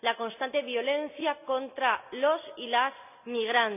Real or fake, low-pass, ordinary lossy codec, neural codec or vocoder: real; 3.6 kHz; none; none